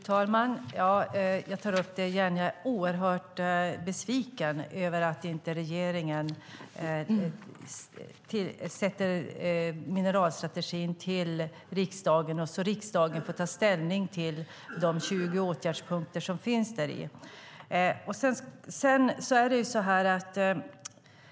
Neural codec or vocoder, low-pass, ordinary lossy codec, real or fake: none; none; none; real